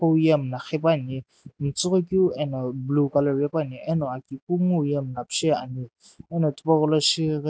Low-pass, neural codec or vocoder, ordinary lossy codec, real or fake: none; none; none; real